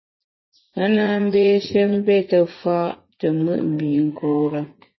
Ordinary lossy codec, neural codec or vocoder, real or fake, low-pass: MP3, 24 kbps; vocoder, 44.1 kHz, 80 mel bands, Vocos; fake; 7.2 kHz